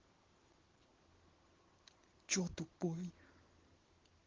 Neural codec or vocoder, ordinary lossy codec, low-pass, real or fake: none; Opus, 16 kbps; 7.2 kHz; real